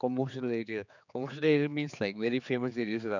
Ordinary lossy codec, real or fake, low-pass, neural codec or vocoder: none; fake; 7.2 kHz; codec, 16 kHz, 4 kbps, X-Codec, HuBERT features, trained on general audio